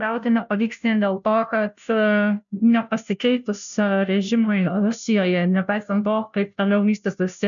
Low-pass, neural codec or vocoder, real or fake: 7.2 kHz; codec, 16 kHz, 0.5 kbps, FunCodec, trained on Chinese and English, 25 frames a second; fake